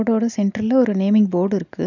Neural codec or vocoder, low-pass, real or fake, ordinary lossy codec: none; 7.2 kHz; real; none